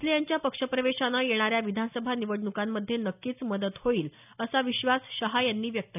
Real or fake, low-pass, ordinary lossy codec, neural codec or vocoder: real; 3.6 kHz; none; none